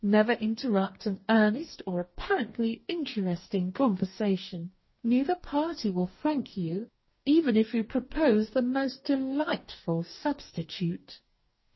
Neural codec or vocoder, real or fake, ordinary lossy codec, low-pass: codec, 44.1 kHz, 2.6 kbps, DAC; fake; MP3, 24 kbps; 7.2 kHz